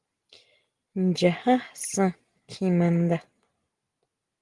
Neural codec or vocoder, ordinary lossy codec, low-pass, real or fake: none; Opus, 24 kbps; 10.8 kHz; real